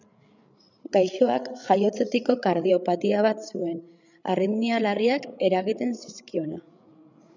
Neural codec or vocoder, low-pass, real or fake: codec, 16 kHz, 8 kbps, FreqCodec, larger model; 7.2 kHz; fake